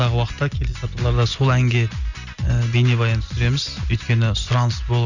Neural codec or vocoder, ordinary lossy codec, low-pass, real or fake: none; none; 7.2 kHz; real